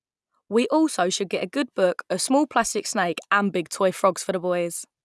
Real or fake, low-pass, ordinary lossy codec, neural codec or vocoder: real; none; none; none